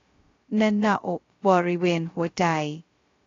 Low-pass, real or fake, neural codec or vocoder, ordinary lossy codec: 7.2 kHz; fake; codec, 16 kHz, 0.2 kbps, FocalCodec; AAC, 32 kbps